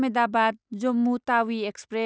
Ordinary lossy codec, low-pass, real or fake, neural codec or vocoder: none; none; real; none